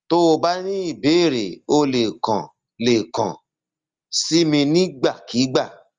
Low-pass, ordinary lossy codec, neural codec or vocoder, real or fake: 7.2 kHz; Opus, 32 kbps; none; real